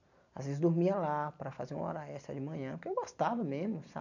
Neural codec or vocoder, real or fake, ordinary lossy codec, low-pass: none; real; none; 7.2 kHz